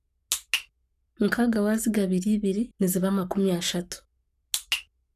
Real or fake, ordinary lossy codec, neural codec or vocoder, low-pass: fake; none; codec, 44.1 kHz, 7.8 kbps, Pupu-Codec; 14.4 kHz